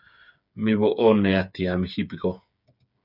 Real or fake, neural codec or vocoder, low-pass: fake; codec, 16 kHz, 8 kbps, FreqCodec, smaller model; 5.4 kHz